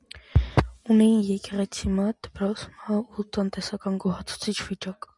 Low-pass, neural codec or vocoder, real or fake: 10.8 kHz; none; real